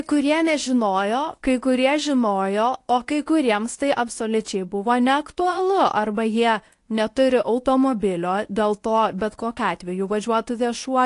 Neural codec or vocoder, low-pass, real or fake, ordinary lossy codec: codec, 24 kHz, 0.9 kbps, WavTokenizer, medium speech release version 1; 10.8 kHz; fake; AAC, 48 kbps